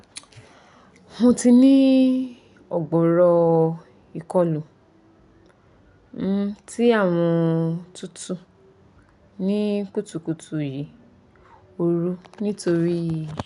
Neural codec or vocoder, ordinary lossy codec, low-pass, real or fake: none; none; 10.8 kHz; real